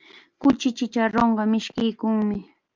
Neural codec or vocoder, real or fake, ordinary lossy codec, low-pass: none; real; Opus, 24 kbps; 7.2 kHz